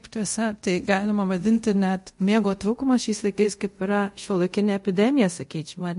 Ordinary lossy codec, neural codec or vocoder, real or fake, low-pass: MP3, 48 kbps; codec, 24 kHz, 0.5 kbps, DualCodec; fake; 10.8 kHz